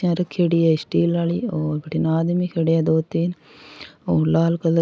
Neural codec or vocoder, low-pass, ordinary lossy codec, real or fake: none; none; none; real